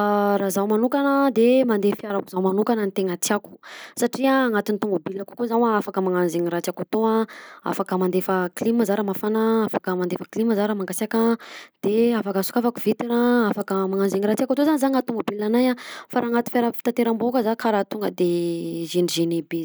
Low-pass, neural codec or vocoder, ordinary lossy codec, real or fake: none; none; none; real